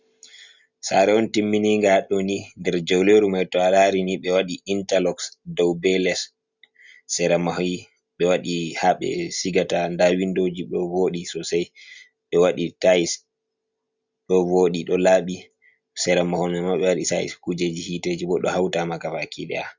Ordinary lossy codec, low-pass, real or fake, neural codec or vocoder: Opus, 64 kbps; 7.2 kHz; real; none